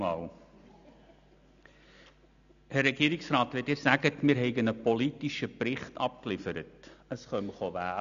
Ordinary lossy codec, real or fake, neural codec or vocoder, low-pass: none; real; none; 7.2 kHz